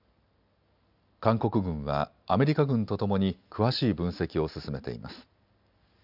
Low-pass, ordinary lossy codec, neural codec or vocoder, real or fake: 5.4 kHz; none; none; real